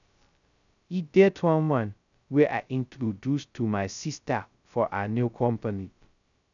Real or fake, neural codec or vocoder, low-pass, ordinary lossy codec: fake; codec, 16 kHz, 0.2 kbps, FocalCodec; 7.2 kHz; none